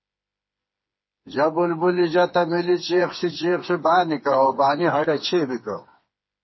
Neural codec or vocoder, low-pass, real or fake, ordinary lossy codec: codec, 16 kHz, 4 kbps, FreqCodec, smaller model; 7.2 kHz; fake; MP3, 24 kbps